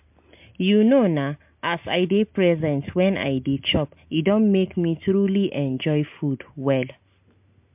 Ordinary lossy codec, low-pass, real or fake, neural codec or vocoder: MP3, 32 kbps; 3.6 kHz; real; none